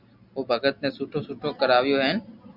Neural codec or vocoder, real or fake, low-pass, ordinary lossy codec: none; real; 5.4 kHz; Opus, 64 kbps